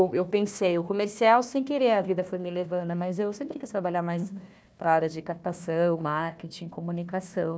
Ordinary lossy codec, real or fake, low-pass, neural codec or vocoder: none; fake; none; codec, 16 kHz, 1 kbps, FunCodec, trained on Chinese and English, 50 frames a second